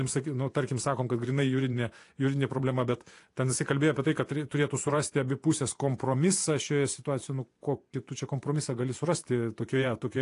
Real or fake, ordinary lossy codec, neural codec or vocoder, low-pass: fake; AAC, 48 kbps; vocoder, 24 kHz, 100 mel bands, Vocos; 10.8 kHz